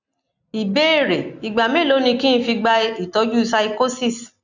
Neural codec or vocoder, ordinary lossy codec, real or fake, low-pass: none; none; real; 7.2 kHz